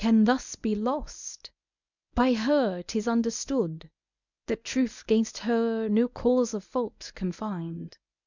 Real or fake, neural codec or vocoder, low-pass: fake; codec, 24 kHz, 0.9 kbps, WavTokenizer, medium speech release version 1; 7.2 kHz